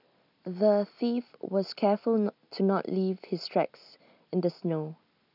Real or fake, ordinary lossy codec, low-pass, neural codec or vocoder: real; none; 5.4 kHz; none